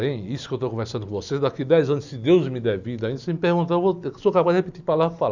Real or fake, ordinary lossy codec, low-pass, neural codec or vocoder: real; none; 7.2 kHz; none